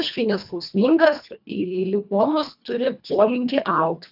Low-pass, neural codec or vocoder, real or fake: 5.4 kHz; codec, 24 kHz, 1.5 kbps, HILCodec; fake